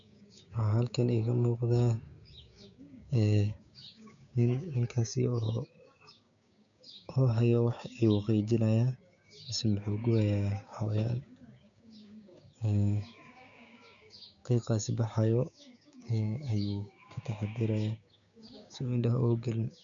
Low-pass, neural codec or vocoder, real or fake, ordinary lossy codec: 7.2 kHz; codec, 16 kHz, 6 kbps, DAC; fake; none